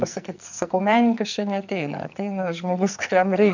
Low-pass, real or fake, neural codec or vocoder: 7.2 kHz; fake; codec, 44.1 kHz, 2.6 kbps, SNAC